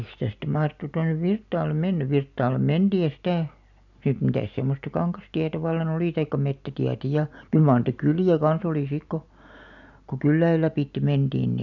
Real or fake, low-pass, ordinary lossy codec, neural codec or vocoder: real; 7.2 kHz; none; none